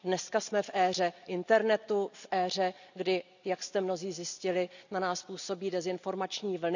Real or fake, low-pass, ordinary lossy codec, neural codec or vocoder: real; 7.2 kHz; none; none